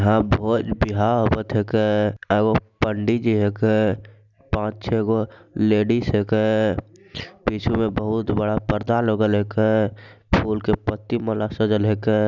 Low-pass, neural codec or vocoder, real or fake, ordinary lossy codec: 7.2 kHz; none; real; none